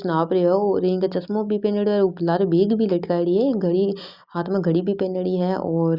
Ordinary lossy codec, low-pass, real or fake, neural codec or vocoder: Opus, 64 kbps; 5.4 kHz; real; none